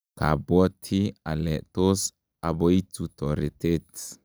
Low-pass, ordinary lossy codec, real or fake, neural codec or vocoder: none; none; real; none